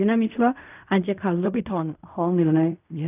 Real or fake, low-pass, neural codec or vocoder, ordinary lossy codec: fake; 3.6 kHz; codec, 16 kHz in and 24 kHz out, 0.4 kbps, LongCat-Audio-Codec, fine tuned four codebook decoder; none